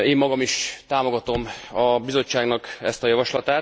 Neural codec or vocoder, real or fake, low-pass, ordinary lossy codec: none; real; none; none